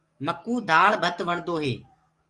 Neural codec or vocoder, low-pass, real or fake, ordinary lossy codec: codec, 44.1 kHz, 7.8 kbps, DAC; 10.8 kHz; fake; Opus, 32 kbps